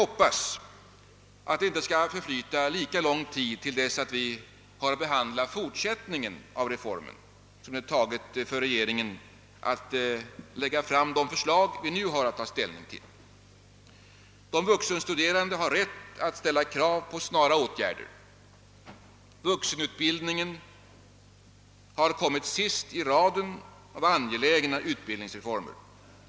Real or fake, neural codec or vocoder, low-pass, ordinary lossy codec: real; none; none; none